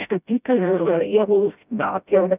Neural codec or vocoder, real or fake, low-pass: codec, 16 kHz, 0.5 kbps, FreqCodec, smaller model; fake; 3.6 kHz